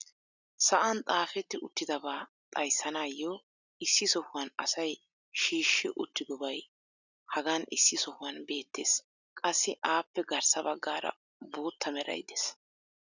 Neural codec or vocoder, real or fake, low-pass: none; real; 7.2 kHz